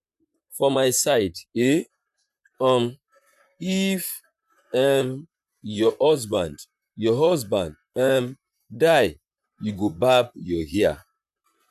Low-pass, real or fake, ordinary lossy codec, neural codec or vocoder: 14.4 kHz; fake; none; vocoder, 44.1 kHz, 128 mel bands, Pupu-Vocoder